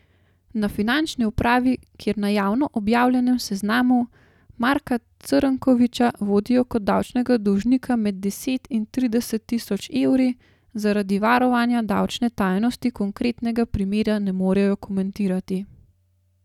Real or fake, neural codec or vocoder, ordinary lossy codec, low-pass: real; none; none; 19.8 kHz